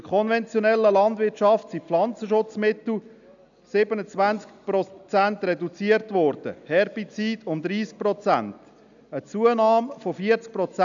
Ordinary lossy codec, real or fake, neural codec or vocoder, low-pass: none; real; none; 7.2 kHz